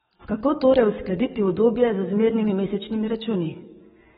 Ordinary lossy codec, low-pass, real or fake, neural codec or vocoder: AAC, 16 kbps; 19.8 kHz; fake; codec, 44.1 kHz, 7.8 kbps, DAC